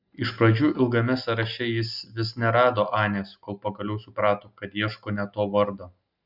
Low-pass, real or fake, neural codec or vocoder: 5.4 kHz; real; none